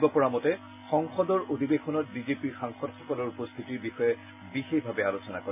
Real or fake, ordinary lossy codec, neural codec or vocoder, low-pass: real; none; none; 3.6 kHz